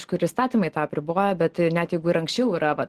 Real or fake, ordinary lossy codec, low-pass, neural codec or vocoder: real; Opus, 16 kbps; 14.4 kHz; none